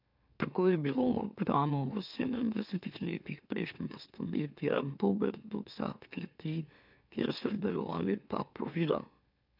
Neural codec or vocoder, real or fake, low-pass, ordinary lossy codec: autoencoder, 44.1 kHz, a latent of 192 numbers a frame, MeloTTS; fake; 5.4 kHz; none